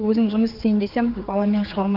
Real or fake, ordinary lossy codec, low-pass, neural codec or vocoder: fake; Opus, 32 kbps; 5.4 kHz; codec, 16 kHz, 4 kbps, X-Codec, HuBERT features, trained on general audio